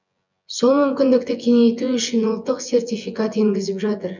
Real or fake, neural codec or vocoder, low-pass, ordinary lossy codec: fake; vocoder, 24 kHz, 100 mel bands, Vocos; 7.2 kHz; none